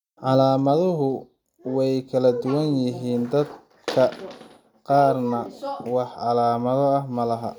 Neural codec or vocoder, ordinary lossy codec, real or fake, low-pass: none; none; real; 19.8 kHz